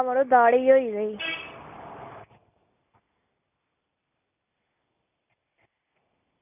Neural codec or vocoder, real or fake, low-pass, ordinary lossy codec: none; real; 3.6 kHz; none